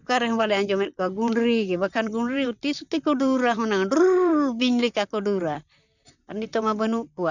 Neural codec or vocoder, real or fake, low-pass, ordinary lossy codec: vocoder, 44.1 kHz, 128 mel bands, Pupu-Vocoder; fake; 7.2 kHz; none